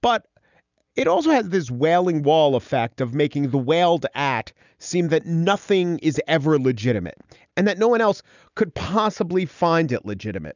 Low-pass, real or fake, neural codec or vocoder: 7.2 kHz; real; none